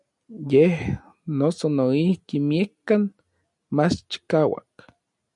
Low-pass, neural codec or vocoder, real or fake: 10.8 kHz; none; real